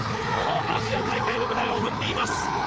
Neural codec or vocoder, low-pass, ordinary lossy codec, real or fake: codec, 16 kHz, 4 kbps, FreqCodec, larger model; none; none; fake